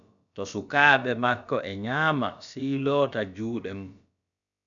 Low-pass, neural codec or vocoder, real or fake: 7.2 kHz; codec, 16 kHz, about 1 kbps, DyCAST, with the encoder's durations; fake